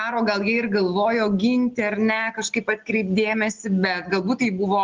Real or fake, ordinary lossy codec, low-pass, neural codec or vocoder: real; Opus, 16 kbps; 7.2 kHz; none